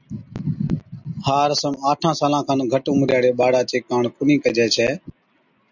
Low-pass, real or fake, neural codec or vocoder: 7.2 kHz; real; none